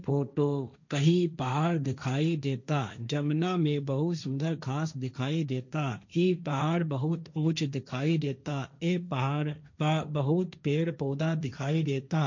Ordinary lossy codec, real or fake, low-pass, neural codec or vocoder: none; fake; 7.2 kHz; codec, 16 kHz, 1.1 kbps, Voila-Tokenizer